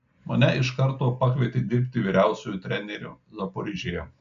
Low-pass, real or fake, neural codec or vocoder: 7.2 kHz; real; none